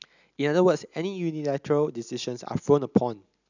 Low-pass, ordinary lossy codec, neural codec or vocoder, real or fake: 7.2 kHz; none; none; real